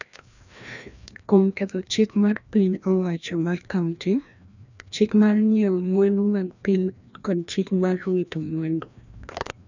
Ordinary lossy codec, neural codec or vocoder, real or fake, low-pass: none; codec, 16 kHz, 1 kbps, FreqCodec, larger model; fake; 7.2 kHz